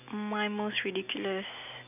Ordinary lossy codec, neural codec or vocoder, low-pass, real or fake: none; none; 3.6 kHz; real